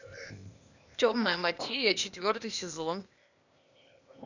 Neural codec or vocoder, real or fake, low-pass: codec, 16 kHz, 0.8 kbps, ZipCodec; fake; 7.2 kHz